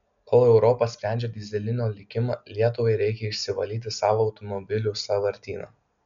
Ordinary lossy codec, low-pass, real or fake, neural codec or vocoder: MP3, 96 kbps; 7.2 kHz; real; none